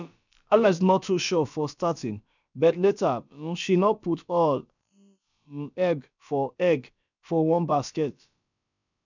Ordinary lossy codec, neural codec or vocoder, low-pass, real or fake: none; codec, 16 kHz, about 1 kbps, DyCAST, with the encoder's durations; 7.2 kHz; fake